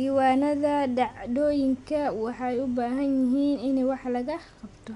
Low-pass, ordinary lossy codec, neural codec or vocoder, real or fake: 10.8 kHz; none; none; real